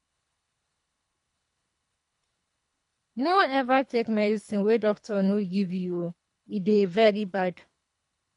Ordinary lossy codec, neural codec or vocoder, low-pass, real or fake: MP3, 64 kbps; codec, 24 kHz, 3 kbps, HILCodec; 10.8 kHz; fake